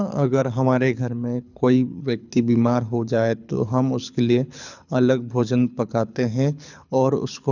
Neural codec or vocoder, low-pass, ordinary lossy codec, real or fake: codec, 24 kHz, 6 kbps, HILCodec; 7.2 kHz; none; fake